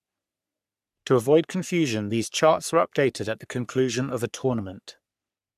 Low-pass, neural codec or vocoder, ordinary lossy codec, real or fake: 14.4 kHz; codec, 44.1 kHz, 3.4 kbps, Pupu-Codec; none; fake